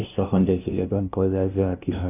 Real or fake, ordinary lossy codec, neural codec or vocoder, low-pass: fake; none; codec, 16 kHz, 0.5 kbps, FunCodec, trained on LibriTTS, 25 frames a second; 3.6 kHz